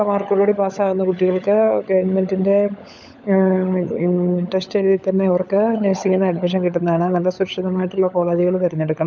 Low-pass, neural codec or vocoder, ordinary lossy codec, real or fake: 7.2 kHz; codec, 16 kHz, 16 kbps, FunCodec, trained on LibriTTS, 50 frames a second; none; fake